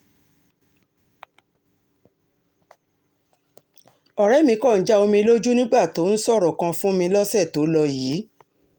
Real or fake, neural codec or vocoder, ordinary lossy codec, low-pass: real; none; Opus, 32 kbps; 19.8 kHz